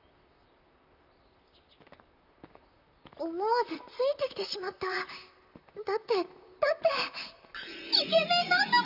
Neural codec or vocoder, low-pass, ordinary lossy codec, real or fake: vocoder, 44.1 kHz, 128 mel bands, Pupu-Vocoder; 5.4 kHz; none; fake